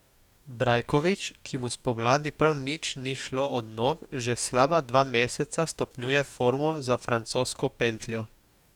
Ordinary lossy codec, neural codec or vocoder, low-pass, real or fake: none; codec, 44.1 kHz, 2.6 kbps, DAC; 19.8 kHz; fake